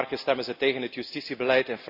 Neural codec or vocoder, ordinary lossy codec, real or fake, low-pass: none; AAC, 48 kbps; real; 5.4 kHz